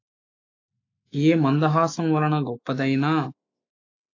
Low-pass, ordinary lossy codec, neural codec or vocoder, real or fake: 7.2 kHz; AAC, 48 kbps; autoencoder, 48 kHz, 128 numbers a frame, DAC-VAE, trained on Japanese speech; fake